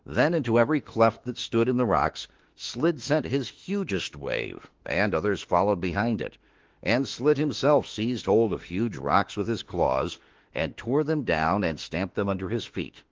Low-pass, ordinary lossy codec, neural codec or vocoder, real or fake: 7.2 kHz; Opus, 16 kbps; autoencoder, 48 kHz, 32 numbers a frame, DAC-VAE, trained on Japanese speech; fake